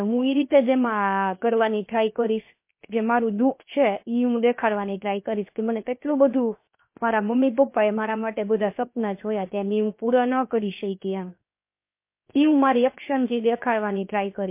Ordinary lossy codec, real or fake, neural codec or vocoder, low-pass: MP3, 24 kbps; fake; codec, 16 kHz, 0.7 kbps, FocalCodec; 3.6 kHz